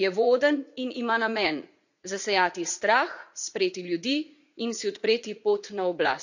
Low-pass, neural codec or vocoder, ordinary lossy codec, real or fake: 7.2 kHz; vocoder, 44.1 kHz, 80 mel bands, Vocos; none; fake